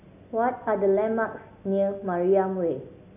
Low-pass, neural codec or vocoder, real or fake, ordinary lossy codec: 3.6 kHz; none; real; none